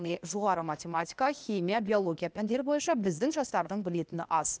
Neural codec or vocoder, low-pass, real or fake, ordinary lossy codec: codec, 16 kHz, 0.8 kbps, ZipCodec; none; fake; none